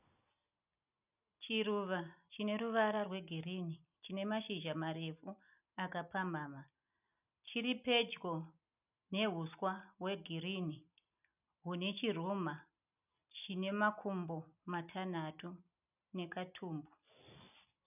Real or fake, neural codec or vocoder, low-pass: real; none; 3.6 kHz